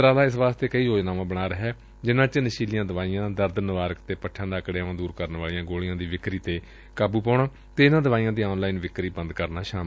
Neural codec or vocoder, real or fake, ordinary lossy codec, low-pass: none; real; none; none